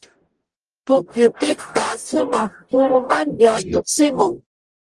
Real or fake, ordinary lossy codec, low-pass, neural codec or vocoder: fake; Opus, 24 kbps; 10.8 kHz; codec, 44.1 kHz, 0.9 kbps, DAC